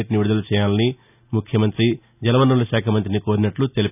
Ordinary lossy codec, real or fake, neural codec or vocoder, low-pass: none; real; none; 3.6 kHz